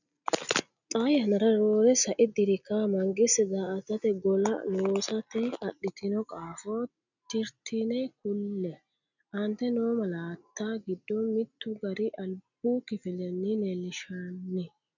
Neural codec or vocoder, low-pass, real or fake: none; 7.2 kHz; real